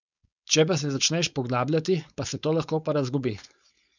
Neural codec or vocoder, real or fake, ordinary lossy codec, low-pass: codec, 16 kHz, 4.8 kbps, FACodec; fake; none; 7.2 kHz